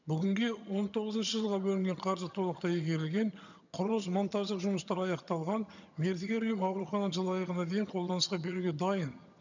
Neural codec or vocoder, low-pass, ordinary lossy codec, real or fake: vocoder, 22.05 kHz, 80 mel bands, HiFi-GAN; 7.2 kHz; none; fake